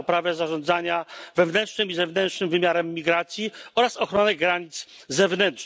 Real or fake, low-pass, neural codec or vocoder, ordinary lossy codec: real; none; none; none